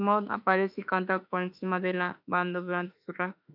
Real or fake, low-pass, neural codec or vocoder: fake; 5.4 kHz; autoencoder, 48 kHz, 32 numbers a frame, DAC-VAE, trained on Japanese speech